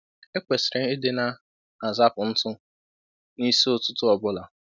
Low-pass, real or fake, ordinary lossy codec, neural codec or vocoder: 7.2 kHz; real; none; none